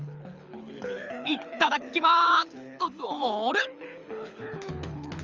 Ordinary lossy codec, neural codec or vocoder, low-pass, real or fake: Opus, 32 kbps; codec, 24 kHz, 6 kbps, HILCodec; 7.2 kHz; fake